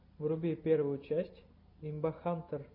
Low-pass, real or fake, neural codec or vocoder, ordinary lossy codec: 5.4 kHz; real; none; MP3, 32 kbps